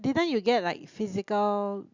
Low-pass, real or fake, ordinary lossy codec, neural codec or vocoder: 7.2 kHz; real; Opus, 64 kbps; none